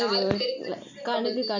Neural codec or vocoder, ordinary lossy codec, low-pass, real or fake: none; none; 7.2 kHz; real